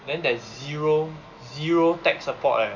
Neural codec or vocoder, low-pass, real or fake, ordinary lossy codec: none; 7.2 kHz; real; none